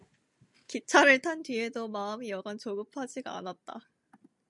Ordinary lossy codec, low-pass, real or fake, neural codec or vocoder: MP3, 96 kbps; 10.8 kHz; real; none